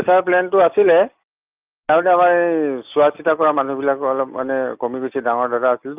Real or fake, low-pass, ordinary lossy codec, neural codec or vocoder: real; 3.6 kHz; Opus, 24 kbps; none